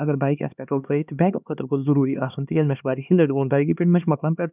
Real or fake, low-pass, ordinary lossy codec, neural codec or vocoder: fake; 3.6 kHz; none; codec, 16 kHz, 2 kbps, X-Codec, HuBERT features, trained on LibriSpeech